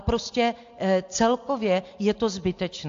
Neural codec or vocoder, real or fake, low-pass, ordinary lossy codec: none; real; 7.2 kHz; AAC, 48 kbps